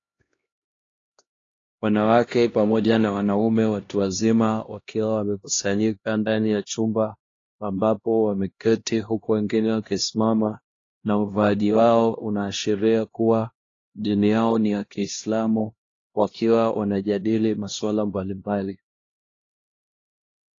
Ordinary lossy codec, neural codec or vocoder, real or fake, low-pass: AAC, 32 kbps; codec, 16 kHz, 1 kbps, X-Codec, HuBERT features, trained on LibriSpeech; fake; 7.2 kHz